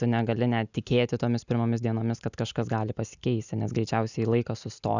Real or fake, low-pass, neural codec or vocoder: real; 7.2 kHz; none